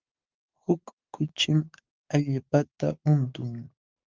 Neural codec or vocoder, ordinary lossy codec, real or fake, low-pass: codec, 16 kHz, 6 kbps, DAC; Opus, 24 kbps; fake; 7.2 kHz